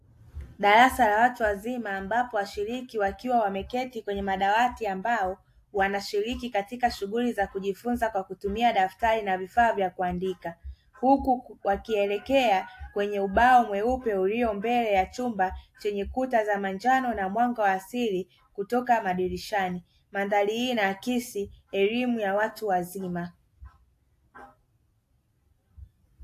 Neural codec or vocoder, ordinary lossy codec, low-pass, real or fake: none; AAC, 64 kbps; 14.4 kHz; real